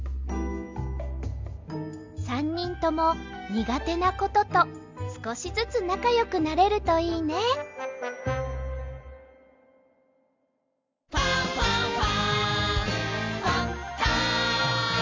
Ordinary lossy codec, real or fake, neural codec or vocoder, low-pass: MP3, 64 kbps; real; none; 7.2 kHz